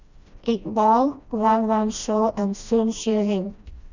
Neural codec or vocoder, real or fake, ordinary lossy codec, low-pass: codec, 16 kHz, 1 kbps, FreqCodec, smaller model; fake; none; 7.2 kHz